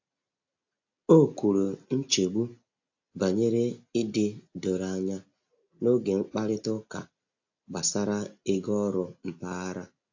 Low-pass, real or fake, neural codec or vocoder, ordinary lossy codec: 7.2 kHz; real; none; AAC, 48 kbps